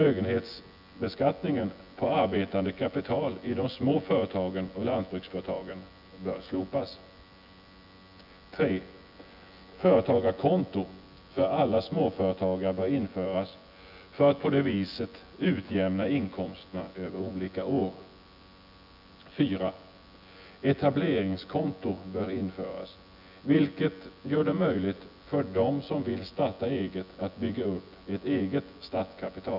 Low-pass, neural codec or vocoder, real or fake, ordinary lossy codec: 5.4 kHz; vocoder, 24 kHz, 100 mel bands, Vocos; fake; none